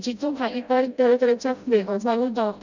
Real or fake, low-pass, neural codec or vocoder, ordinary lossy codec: fake; 7.2 kHz; codec, 16 kHz, 0.5 kbps, FreqCodec, smaller model; none